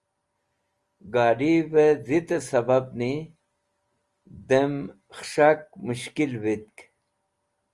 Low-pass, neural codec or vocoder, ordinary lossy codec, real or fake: 10.8 kHz; none; Opus, 32 kbps; real